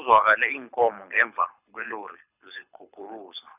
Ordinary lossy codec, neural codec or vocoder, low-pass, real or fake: none; codec, 24 kHz, 6 kbps, HILCodec; 3.6 kHz; fake